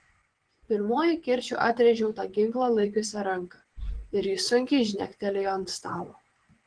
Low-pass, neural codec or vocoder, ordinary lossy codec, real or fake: 9.9 kHz; vocoder, 24 kHz, 100 mel bands, Vocos; Opus, 16 kbps; fake